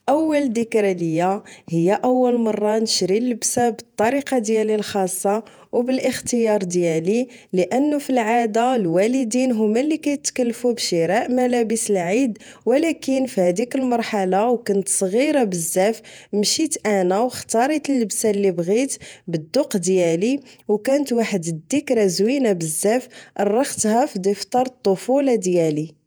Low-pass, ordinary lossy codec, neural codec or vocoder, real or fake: none; none; vocoder, 48 kHz, 128 mel bands, Vocos; fake